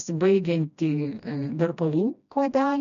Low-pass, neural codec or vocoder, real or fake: 7.2 kHz; codec, 16 kHz, 1 kbps, FreqCodec, smaller model; fake